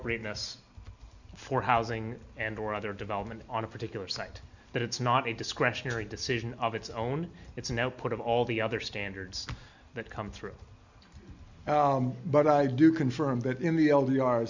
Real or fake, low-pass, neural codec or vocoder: real; 7.2 kHz; none